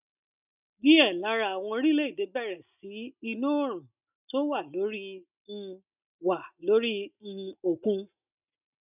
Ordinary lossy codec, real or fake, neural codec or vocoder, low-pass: none; real; none; 3.6 kHz